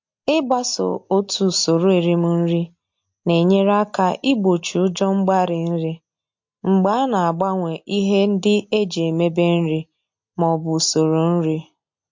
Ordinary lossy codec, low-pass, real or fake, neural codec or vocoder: MP3, 48 kbps; 7.2 kHz; real; none